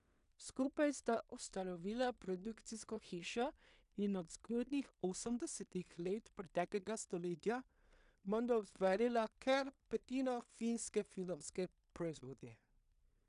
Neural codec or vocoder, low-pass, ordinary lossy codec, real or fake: codec, 16 kHz in and 24 kHz out, 0.4 kbps, LongCat-Audio-Codec, two codebook decoder; 10.8 kHz; AAC, 96 kbps; fake